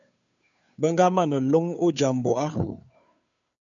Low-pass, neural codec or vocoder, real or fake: 7.2 kHz; codec, 16 kHz, 2 kbps, FunCodec, trained on Chinese and English, 25 frames a second; fake